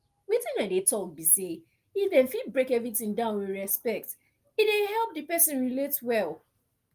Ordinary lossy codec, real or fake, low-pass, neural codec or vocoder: Opus, 32 kbps; real; 14.4 kHz; none